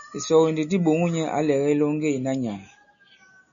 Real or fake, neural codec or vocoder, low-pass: real; none; 7.2 kHz